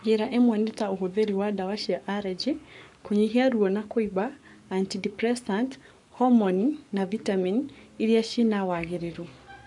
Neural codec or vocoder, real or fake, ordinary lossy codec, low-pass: codec, 44.1 kHz, 7.8 kbps, Pupu-Codec; fake; none; 10.8 kHz